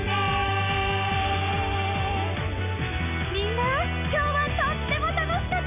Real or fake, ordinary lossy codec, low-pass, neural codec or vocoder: real; none; 3.6 kHz; none